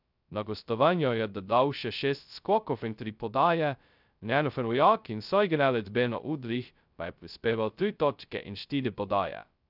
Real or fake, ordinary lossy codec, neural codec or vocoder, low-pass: fake; none; codec, 16 kHz, 0.2 kbps, FocalCodec; 5.4 kHz